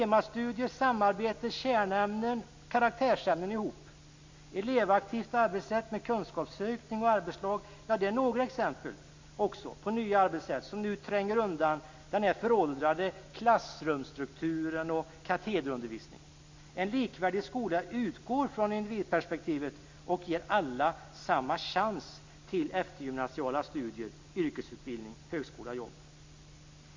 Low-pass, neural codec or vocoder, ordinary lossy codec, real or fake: 7.2 kHz; none; MP3, 64 kbps; real